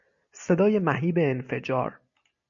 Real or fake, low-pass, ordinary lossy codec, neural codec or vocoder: real; 7.2 kHz; MP3, 96 kbps; none